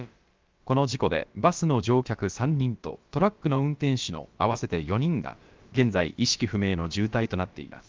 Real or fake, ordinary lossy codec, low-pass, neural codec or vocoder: fake; Opus, 32 kbps; 7.2 kHz; codec, 16 kHz, about 1 kbps, DyCAST, with the encoder's durations